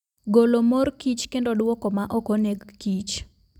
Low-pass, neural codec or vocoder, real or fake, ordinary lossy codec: 19.8 kHz; none; real; none